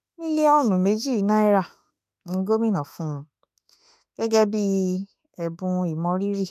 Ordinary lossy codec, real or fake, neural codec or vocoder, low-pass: MP3, 96 kbps; fake; autoencoder, 48 kHz, 32 numbers a frame, DAC-VAE, trained on Japanese speech; 14.4 kHz